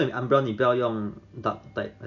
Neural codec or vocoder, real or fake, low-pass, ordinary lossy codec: none; real; 7.2 kHz; none